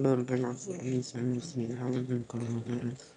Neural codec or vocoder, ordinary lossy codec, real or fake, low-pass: autoencoder, 22.05 kHz, a latent of 192 numbers a frame, VITS, trained on one speaker; none; fake; 9.9 kHz